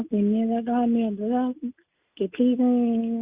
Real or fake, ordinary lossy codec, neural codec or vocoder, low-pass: real; Opus, 64 kbps; none; 3.6 kHz